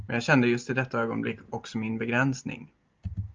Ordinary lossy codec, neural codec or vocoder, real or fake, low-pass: Opus, 24 kbps; none; real; 7.2 kHz